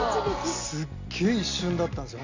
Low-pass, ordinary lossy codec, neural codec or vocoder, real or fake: 7.2 kHz; Opus, 64 kbps; none; real